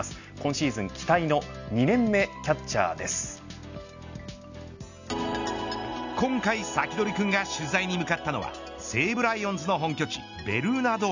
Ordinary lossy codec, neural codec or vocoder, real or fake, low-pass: none; none; real; 7.2 kHz